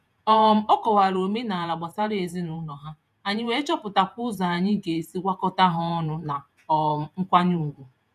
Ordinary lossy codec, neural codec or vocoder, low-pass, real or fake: none; vocoder, 48 kHz, 128 mel bands, Vocos; 14.4 kHz; fake